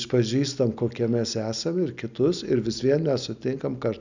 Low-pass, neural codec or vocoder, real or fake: 7.2 kHz; none; real